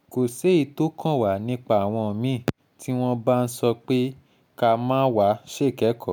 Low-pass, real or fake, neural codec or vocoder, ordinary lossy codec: none; real; none; none